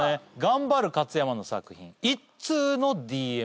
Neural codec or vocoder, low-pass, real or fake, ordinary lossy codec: none; none; real; none